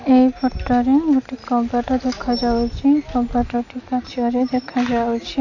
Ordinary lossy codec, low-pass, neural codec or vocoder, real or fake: none; 7.2 kHz; none; real